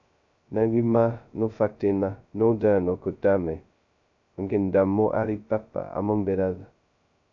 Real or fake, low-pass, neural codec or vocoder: fake; 7.2 kHz; codec, 16 kHz, 0.2 kbps, FocalCodec